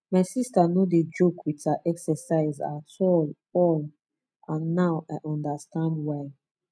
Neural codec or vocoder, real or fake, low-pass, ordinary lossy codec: none; real; none; none